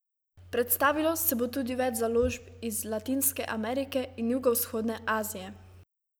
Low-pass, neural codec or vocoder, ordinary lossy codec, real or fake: none; none; none; real